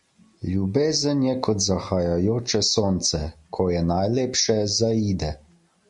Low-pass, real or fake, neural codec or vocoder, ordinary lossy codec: 10.8 kHz; real; none; MP3, 64 kbps